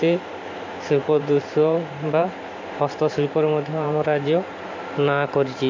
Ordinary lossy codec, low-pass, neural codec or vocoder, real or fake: MP3, 48 kbps; 7.2 kHz; vocoder, 44.1 kHz, 128 mel bands every 512 samples, BigVGAN v2; fake